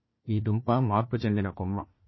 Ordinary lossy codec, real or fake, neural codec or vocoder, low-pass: MP3, 24 kbps; fake; codec, 16 kHz, 1 kbps, FunCodec, trained on Chinese and English, 50 frames a second; 7.2 kHz